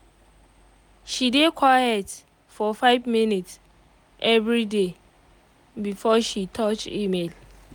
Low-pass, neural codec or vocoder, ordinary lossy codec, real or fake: none; none; none; real